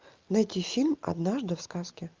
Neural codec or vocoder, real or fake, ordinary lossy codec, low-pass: none; real; Opus, 32 kbps; 7.2 kHz